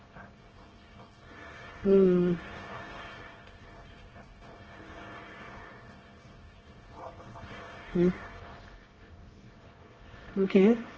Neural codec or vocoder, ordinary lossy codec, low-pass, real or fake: codec, 24 kHz, 1 kbps, SNAC; Opus, 16 kbps; 7.2 kHz; fake